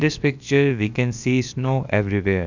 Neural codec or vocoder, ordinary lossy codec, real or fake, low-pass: codec, 16 kHz, about 1 kbps, DyCAST, with the encoder's durations; none; fake; 7.2 kHz